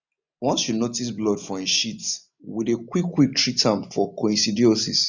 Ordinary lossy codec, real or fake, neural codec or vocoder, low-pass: none; real; none; 7.2 kHz